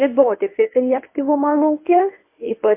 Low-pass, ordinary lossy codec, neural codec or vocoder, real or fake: 3.6 kHz; MP3, 24 kbps; codec, 24 kHz, 0.9 kbps, WavTokenizer, medium speech release version 1; fake